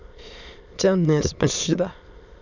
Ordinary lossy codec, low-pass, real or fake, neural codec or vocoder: none; 7.2 kHz; fake; autoencoder, 22.05 kHz, a latent of 192 numbers a frame, VITS, trained on many speakers